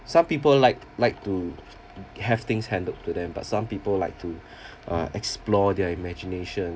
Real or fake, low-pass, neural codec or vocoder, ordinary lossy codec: real; none; none; none